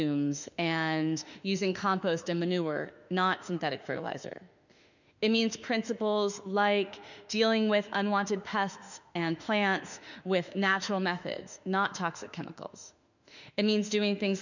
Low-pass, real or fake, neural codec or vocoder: 7.2 kHz; fake; autoencoder, 48 kHz, 32 numbers a frame, DAC-VAE, trained on Japanese speech